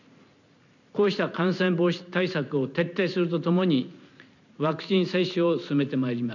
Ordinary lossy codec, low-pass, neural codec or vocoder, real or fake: none; 7.2 kHz; none; real